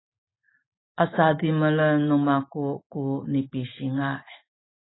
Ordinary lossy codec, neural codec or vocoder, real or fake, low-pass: AAC, 16 kbps; none; real; 7.2 kHz